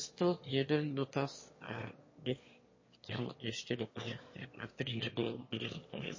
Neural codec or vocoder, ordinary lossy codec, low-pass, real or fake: autoencoder, 22.05 kHz, a latent of 192 numbers a frame, VITS, trained on one speaker; MP3, 32 kbps; 7.2 kHz; fake